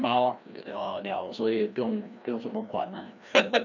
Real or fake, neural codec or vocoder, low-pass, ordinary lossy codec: fake; codec, 16 kHz, 1 kbps, FreqCodec, larger model; 7.2 kHz; none